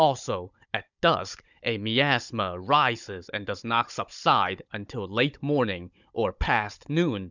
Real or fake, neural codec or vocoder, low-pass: fake; codec, 16 kHz, 16 kbps, FunCodec, trained on Chinese and English, 50 frames a second; 7.2 kHz